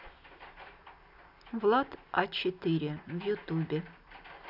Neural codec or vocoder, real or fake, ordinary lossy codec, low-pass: none; real; none; 5.4 kHz